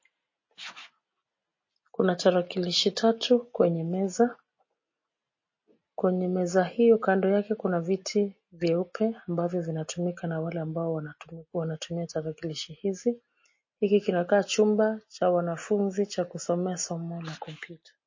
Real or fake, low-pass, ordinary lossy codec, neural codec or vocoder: real; 7.2 kHz; MP3, 32 kbps; none